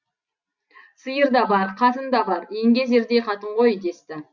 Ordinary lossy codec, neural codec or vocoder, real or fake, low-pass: Opus, 64 kbps; none; real; 7.2 kHz